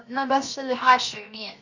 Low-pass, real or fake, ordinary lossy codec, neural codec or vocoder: 7.2 kHz; fake; Opus, 64 kbps; codec, 16 kHz, 0.7 kbps, FocalCodec